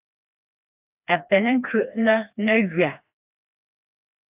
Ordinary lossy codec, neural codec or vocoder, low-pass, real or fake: AAC, 32 kbps; codec, 16 kHz, 2 kbps, FreqCodec, smaller model; 3.6 kHz; fake